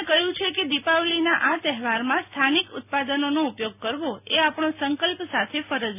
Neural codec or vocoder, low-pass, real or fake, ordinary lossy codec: none; 3.6 kHz; real; none